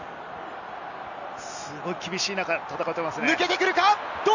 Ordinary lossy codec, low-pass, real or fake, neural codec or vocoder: none; 7.2 kHz; real; none